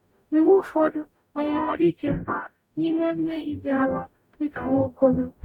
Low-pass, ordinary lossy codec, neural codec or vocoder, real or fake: 19.8 kHz; none; codec, 44.1 kHz, 0.9 kbps, DAC; fake